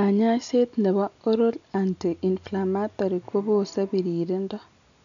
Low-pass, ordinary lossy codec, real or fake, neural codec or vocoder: 7.2 kHz; none; real; none